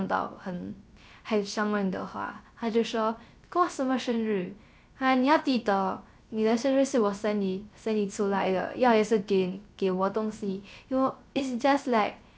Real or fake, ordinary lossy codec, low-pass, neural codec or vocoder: fake; none; none; codec, 16 kHz, 0.3 kbps, FocalCodec